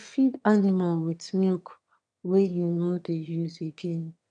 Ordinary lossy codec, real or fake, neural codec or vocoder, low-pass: none; fake; autoencoder, 22.05 kHz, a latent of 192 numbers a frame, VITS, trained on one speaker; 9.9 kHz